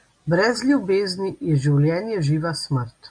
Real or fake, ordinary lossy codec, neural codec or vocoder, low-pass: real; MP3, 64 kbps; none; 9.9 kHz